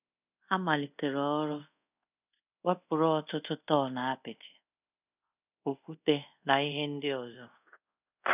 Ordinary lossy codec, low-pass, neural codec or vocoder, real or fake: none; 3.6 kHz; codec, 24 kHz, 0.5 kbps, DualCodec; fake